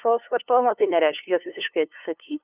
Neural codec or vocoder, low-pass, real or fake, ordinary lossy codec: codec, 16 kHz, 2 kbps, FunCodec, trained on LibriTTS, 25 frames a second; 3.6 kHz; fake; Opus, 24 kbps